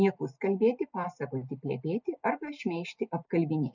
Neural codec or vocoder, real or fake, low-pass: none; real; 7.2 kHz